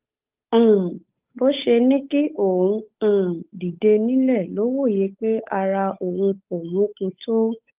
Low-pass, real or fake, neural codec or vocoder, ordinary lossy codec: 3.6 kHz; fake; codec, 16 kHz, 8 kbps, FunCodec, trained on Chinese and English, 25 frames a second; Opus, 24 kbps